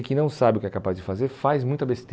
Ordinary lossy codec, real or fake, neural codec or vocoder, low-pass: none; real; none; none